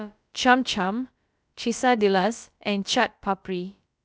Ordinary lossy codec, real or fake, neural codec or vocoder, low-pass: none; fake; codec, 16 kHz, about 1 kbps, DyCAST, with the encoder's durations; none